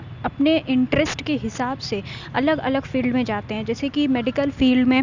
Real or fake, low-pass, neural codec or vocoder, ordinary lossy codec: real; 7.2 kHz; none; Opus, 64 kbps